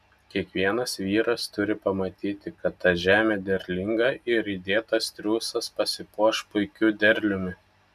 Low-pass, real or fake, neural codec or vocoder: 14.4 kHz; real; none